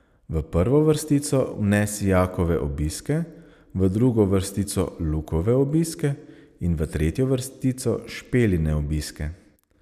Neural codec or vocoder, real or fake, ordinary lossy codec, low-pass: none; real; none; 14.4 kHz